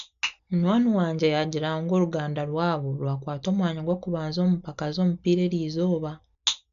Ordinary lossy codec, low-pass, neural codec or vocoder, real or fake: none; 7.2 kHz; none; real